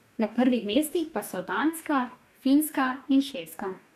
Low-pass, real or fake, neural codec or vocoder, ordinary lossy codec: 14.4 kHz; fake; codec, 44.1 kHz, 2.6 kbps, DAC; none